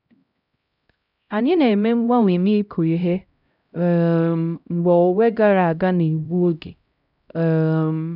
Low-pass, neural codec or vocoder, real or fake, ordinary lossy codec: 5.4 kHz; codec, 16 kHz, 0.5 kbps, X-Codec, HuBERT features, trained on LibriSpeech; fake; none